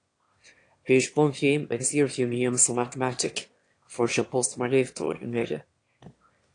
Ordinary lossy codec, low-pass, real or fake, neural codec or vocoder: AAC, 48 kbps; 9.9 kHz; fake; autoencoder, 22.05 kHz, a latent of 192 numbers a frame, VITS, trained on one speaker